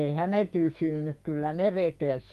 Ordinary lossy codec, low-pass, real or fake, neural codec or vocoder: Opus, 32 kbps; 14.4 kHz; fake; codec, 44.1 kHz, 2.6 kbps, SNAC